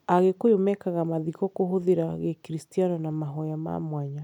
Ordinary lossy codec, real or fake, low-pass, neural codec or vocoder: none; real; 19.8 kHz; none